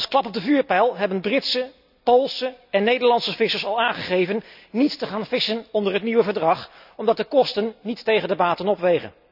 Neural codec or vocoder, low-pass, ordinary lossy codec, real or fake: none; 5.4 kHz; none; real